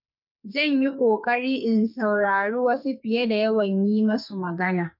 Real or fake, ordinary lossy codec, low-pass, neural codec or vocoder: fake; none; 5.4 kHz; codec, 44.1 kHz, 2.6 kbps, SNAC